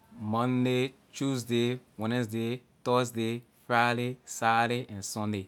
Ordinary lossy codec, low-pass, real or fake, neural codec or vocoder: none; 19.8 kHz; real; none